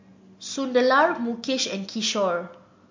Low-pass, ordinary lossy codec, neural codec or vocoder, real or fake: 7.2 kHz; MP3, 48 kbps; none; real